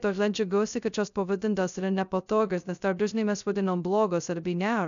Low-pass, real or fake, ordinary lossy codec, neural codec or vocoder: 7.2 kHz; fake; MP3, 96 kbps; codec, 16 kHz, 0.2 kbps, FocalCodec